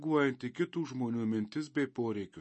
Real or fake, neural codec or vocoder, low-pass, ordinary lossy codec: real; none; 10.8 kHz; MP3, 32 kbps